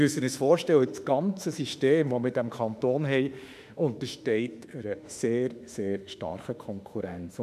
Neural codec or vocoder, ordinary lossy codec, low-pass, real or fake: autoencoder, 48 kHz, 32 numbers a frame, DAC-VAE, trained on Japanese speech; none; 14.4 kHz; fake